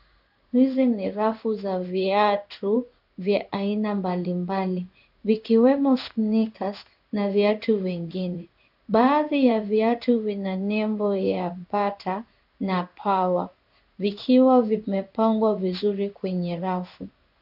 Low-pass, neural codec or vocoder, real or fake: 5.4 kHz; codec, 16 kHz in and 24 kHz out, 1 kbps, XY-Tokenizer; fake